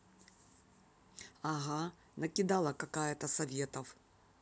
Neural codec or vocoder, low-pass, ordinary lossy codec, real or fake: none; none; none; real